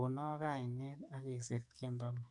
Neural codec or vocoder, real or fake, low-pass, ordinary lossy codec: codec, 44.1 kHz, 2.6 kbps, SNAC; fake; 10.8 kHz; none